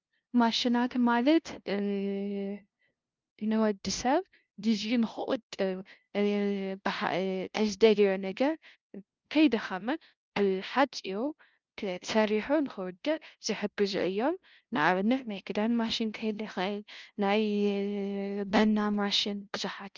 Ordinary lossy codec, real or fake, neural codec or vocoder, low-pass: Opus, 24 kbps; fake; codec, 16 kHz, 0.5 kbps, FunCodec, trained on LibriTTS, 25 frames a second; 7.2 kHz